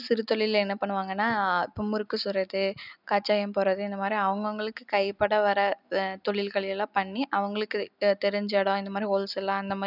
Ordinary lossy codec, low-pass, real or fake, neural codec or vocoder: none; 5.4 kHz; real; none